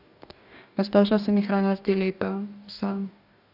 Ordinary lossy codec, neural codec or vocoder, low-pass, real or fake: none; codec, 44.1 kHz, 2.6 kbps, DAC; 5.4 kHz; fake